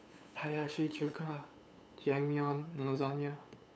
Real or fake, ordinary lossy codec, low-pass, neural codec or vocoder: fake; none; none; codec, 16 kHz, 8 kbps, FunCodec, trained on LibriTTS, 25 frames a second